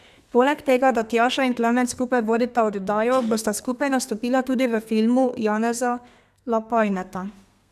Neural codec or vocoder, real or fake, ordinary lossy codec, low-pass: codec, 32 kHz, 1.9 kbps, SNAC; fake; none; 14.4 kHz